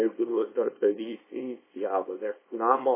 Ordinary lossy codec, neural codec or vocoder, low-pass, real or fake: MP3, 16 kbps; codec, 24 kHz, 0.9 kbps, WavTokenizer, small release; 3.6 kHz; fake